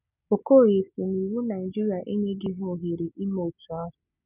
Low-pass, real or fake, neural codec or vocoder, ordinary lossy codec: 3.6 kHz; real; none; none